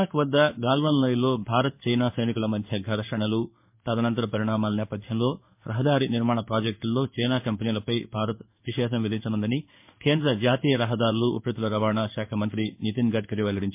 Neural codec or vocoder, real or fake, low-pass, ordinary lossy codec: codec, 16 kHz in and 24 kHz out, 1 kbps, XY-Tokenizer; fake; 3.6 kHz; MP3, 32 kbps